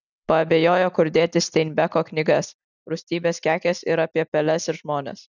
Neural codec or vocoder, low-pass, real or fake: none; 7.2 kHz; real